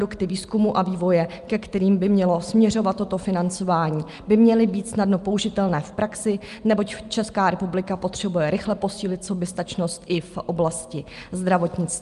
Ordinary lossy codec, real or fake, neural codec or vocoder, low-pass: Opus, 32 kbps; real; none; 10.8 kHz